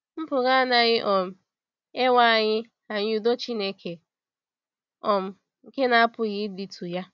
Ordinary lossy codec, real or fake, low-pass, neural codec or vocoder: none; real; 7.2 kHz; none